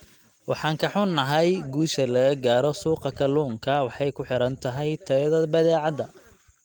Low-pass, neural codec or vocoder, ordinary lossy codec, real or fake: 19.8 kHz; none; Opus, 24 kbps; real